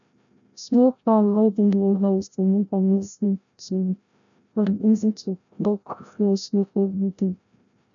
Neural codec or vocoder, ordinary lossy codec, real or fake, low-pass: codec, 16 kHz, 0.5 kbps, FreqCodec, larger model; none; fake; 7.2 kHz